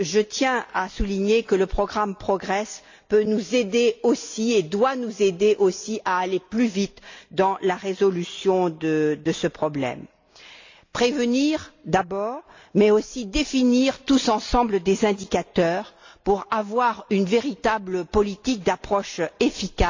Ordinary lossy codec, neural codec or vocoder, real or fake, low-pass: AAC, 48 kbps; none; real; 7.2 kHz